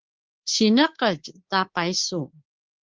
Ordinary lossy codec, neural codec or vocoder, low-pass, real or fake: Opus, 16 kbps; codec, 24 kHz, 3.1 kbps, DualCodec; 7.2 kHz; fake